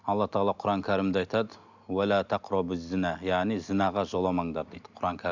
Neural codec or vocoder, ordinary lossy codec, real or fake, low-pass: none; none; real; 7.2 kHz